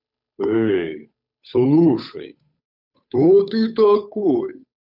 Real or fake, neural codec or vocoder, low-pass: fake; codec, 16 kHz, 8 kbps, FunCodec, trained on Chinese and English, 25 frames a second; 5.4 kHz